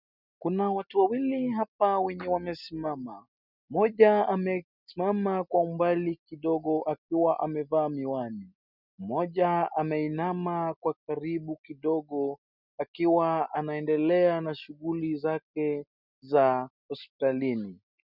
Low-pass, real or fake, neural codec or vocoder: 5.4 kHz; real; none